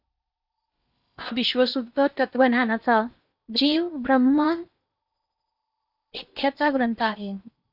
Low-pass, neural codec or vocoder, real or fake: 5.4 kHz; codec, 16 kHz in and 24 kHz out, 0.8 kbps, FocalCodec, streaming, 65536 codes; fake